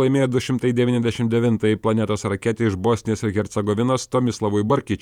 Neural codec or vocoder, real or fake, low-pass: vocoder, 48 kHz, 128 mel bands, Vocos; fake; 19.8 kHz